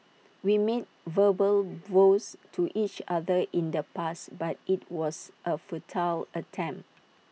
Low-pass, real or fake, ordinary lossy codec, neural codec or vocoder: none; real; none; none